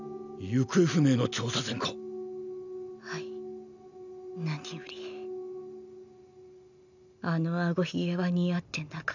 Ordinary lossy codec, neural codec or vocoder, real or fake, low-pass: none; none; real; 7.2 kHz